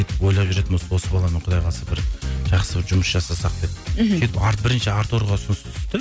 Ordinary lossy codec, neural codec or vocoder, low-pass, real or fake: none; none; none; real